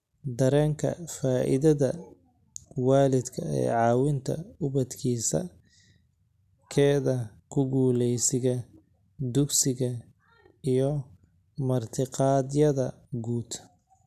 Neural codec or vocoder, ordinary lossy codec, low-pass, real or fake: none; none; 14.4 kHz; real